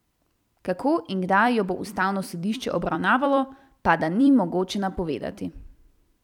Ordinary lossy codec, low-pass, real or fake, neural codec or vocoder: none; 19.8 kHz; fake; vocoder, 44.1 kHz, 128 mel bands every 256 samples, BigVGAN v2